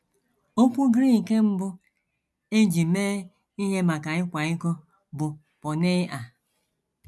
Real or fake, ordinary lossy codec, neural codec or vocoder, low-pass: real; none; none; none